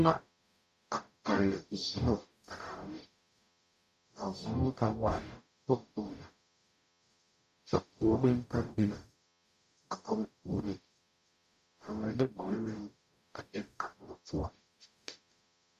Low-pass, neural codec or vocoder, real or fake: 14.4 kHz; codec, 44.1 kHz, 0.9 kbps, DAC; fake